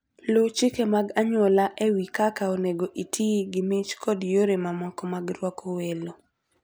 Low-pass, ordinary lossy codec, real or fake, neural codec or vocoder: none; none; real; none